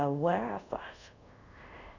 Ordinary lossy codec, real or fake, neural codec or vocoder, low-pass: none; fake; codec, 24 kHz, 0.5 kbps, DualCodec; 7.2 kHz